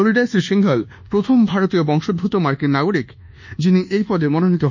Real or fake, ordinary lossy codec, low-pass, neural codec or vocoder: fake; none; 7.2 kHz; codec, 24 kHz, 1.2 kbps, DualCodec